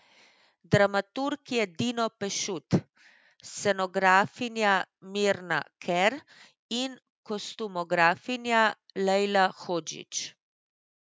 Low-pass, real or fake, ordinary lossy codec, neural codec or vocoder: none; real; none; none